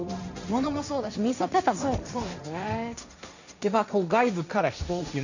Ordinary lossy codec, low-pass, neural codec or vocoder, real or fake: none; 7.2 kHz; codec, 16 kHz, 1.1 kbps, Voila-Tokenizer; fake